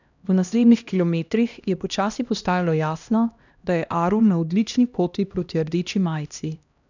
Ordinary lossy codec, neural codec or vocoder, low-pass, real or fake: none; codec, 16 kHz, 1 kbps, X-Codec, HuBERT features, trained on LibriSpeech; 7.2 kHz; fake